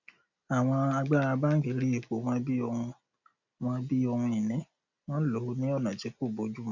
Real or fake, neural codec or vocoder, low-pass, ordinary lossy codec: real; none; 7.2 kHz; none